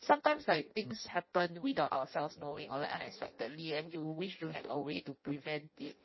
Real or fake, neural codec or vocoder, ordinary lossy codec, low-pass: fake; codec, 16 kHz in and 24 kHz out, 0.6 kbps, FireRedTTS-2 codec; MP3, 24 kbps; 7.2 kHz